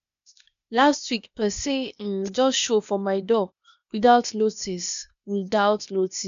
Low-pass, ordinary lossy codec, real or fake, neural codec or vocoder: 7.2 kHz; none; fake; codec, 16 kHz, 0.8 kbps, ZipCodec